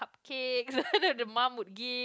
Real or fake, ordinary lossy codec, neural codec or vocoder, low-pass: real; none; none; none